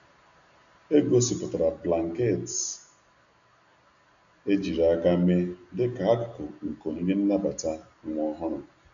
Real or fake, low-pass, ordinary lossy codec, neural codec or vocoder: real; 7.2 kHz; none; none